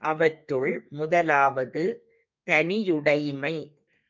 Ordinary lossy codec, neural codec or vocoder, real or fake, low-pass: MP3, 64 kbps; codec, 32 kHz, 1.9 kbps, SNAC; fake; 7.2 kHz